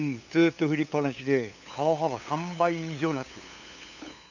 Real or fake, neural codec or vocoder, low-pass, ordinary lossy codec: fake; codec, 16 kHz, 8 kbps, FunCodec, trained on LibriTTS, 25 frames a second; 7.2 kHz; none